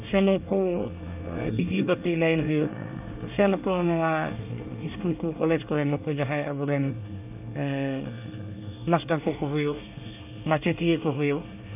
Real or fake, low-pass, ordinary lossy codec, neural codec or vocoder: fake; 3.6 kHz; none; codec, 24 kHz, 1 kbps, SNAC